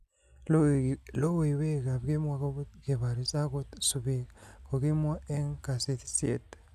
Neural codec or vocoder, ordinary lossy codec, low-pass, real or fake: none; none; 14.4 kHz; real